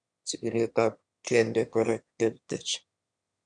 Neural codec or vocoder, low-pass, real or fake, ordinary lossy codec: autoencoder, 22.05 kHz, a latent of 192 numbers a frame, VITS, trained on one speaker; 9.9 kHz; fake; none